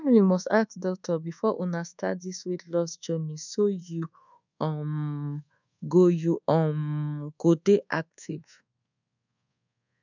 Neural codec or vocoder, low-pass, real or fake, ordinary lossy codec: codec, 24 kHz, 1.2 kbps, DualCodec; 7.2 kHz; fake; none